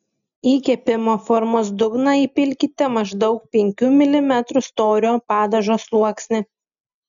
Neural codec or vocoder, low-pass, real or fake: none; 7.2 kHz; real